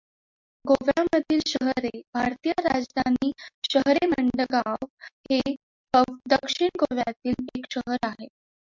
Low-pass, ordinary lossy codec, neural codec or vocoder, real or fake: 7.2 kHz; MP3, 64 kbps; none; real